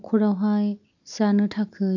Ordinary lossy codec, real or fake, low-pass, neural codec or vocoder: none; real; 7.2 kHz; none